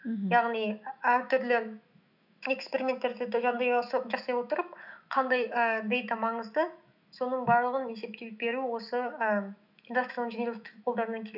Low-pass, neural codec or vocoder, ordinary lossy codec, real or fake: 5.4 kHz; autoencoder, 48 kHz, 128 numbers a frame, DAC-VAE, trained on Japanese speech; none; fake